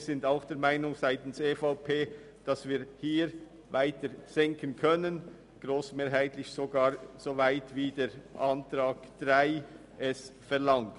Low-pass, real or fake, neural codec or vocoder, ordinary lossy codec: 10.8 kHz; real; none; none